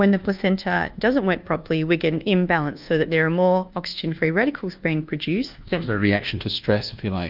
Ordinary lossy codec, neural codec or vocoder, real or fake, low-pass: Opus, 24 kbps; codec, 24 kHz, 1.2 kbps, DualCodec; fake; 5.4 kHz